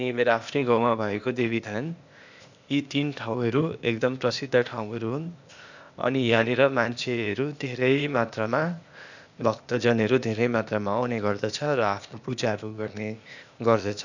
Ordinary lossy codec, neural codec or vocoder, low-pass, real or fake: none; codec, 16 kHz, 0.8 kbps, ZipCodec; 7.2 kHz; fake